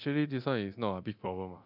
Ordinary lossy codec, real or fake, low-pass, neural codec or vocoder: none; fake; 5.4 kHz; codec, 24 kHz, 0.9 kbps, DualCodec